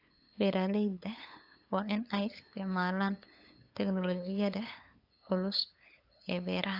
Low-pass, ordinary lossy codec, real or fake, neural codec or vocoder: 5.4 kHz; AAC, 32 kbps; fake; codec, 16 kHz, 8 kbps, FunCodec, trained on LibriTTS, 25 frames a second